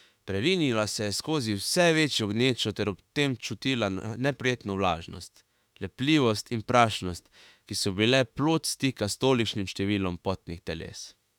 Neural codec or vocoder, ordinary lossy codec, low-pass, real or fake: autoencoder, 48 kHz, 32 numbers a frame, DAC-VAE, trained on Japanese speech; none; 19.8 kHz; fake